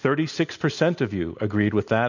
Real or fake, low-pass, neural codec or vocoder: real; 7.2 kHz; none